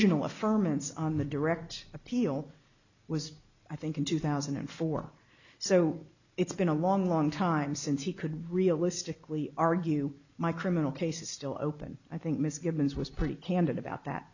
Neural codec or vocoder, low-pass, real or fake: none; 7.2 kHz; real